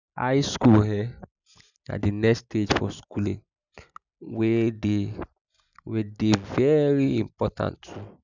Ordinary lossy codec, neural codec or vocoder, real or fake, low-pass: none; none; real; 7.2 kHz